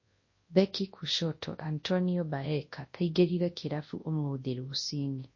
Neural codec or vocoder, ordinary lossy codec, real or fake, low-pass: codec, 24 kHz, 0.9 kbps, WavTokenizer, large speech release; MP3, 32 kbps; fake; 7.2 kHz